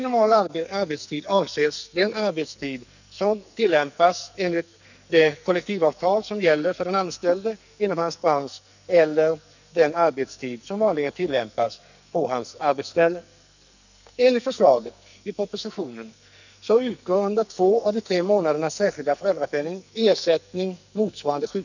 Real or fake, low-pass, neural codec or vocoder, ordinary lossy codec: fake; 7.2 kHz; codec, 44.1 kHz, 2.6 kbps, SNAC; none